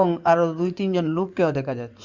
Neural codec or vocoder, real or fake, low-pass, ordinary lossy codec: codec, 16 kHz in and 24 kHz out, 2.2 kbps, FireRedTTS-2 codec; fake; 7.2 kHz; Opus, 64 kbps